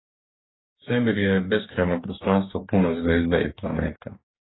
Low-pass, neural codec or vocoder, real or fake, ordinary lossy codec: 7.2 kHz; codec, 44.1 kHz, 2.6 kbps, DAC; fake; AAC, 16 kbps